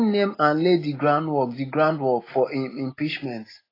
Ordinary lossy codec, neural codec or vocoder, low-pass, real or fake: AAC, 24 kbps; none; 5.4 kHz; real